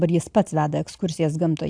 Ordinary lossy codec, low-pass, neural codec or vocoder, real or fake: AAC, 64 kbps; 9.9 kHz; none; real